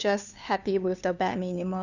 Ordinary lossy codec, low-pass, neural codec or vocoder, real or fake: none; 7.2 kHz; codec, 16 kHz, 2 kbps, FunCodec, trained on LibriTTS, 25 frames a second; fake